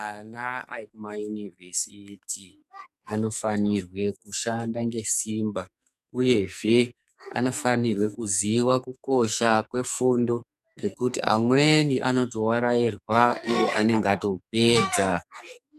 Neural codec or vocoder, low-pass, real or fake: codec, 44.1 kHz, 2.6 kbps, SNAC; 14.4 kHz; fake